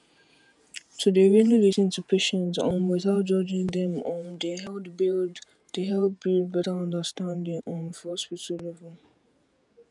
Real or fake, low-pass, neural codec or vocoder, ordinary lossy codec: fake; 10.8 kHz; vocoder, 48 kHz, 128 mel bands, Vocos; none